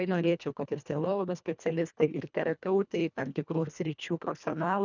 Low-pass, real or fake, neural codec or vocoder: 7.2 kHz; fake; codec, 24 kHz, 1.5 kbps, HILCodec